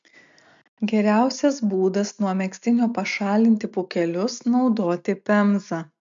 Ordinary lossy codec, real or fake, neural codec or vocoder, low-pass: MP3, 64 kbps; real; none; 7.2 kHz